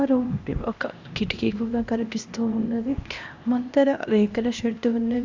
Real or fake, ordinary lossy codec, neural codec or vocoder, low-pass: fake; AAC, 48 kbps; codec, 16 kHz, 1 kbps, X-Codec, HuBERT features, trained on LibriSpeech; 7.2 kHz